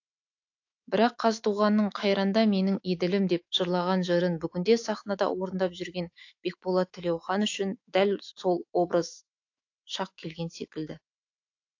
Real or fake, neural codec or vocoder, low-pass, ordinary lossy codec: fake; autoencoder, 48 kHz, 128 numbers a frame, DAC-VAE, trained on Japanese speech; 7.2 kHz; AAC, 48 kbps